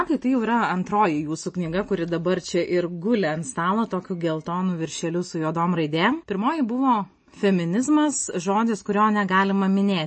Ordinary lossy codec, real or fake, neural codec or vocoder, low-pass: MP3, 32 kbps; real; none; 9.9 kHz